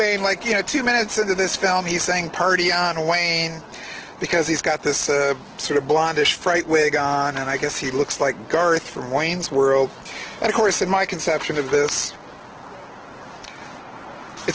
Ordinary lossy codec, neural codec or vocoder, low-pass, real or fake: Opus, 16 kbps; none; 7.2 kHz; real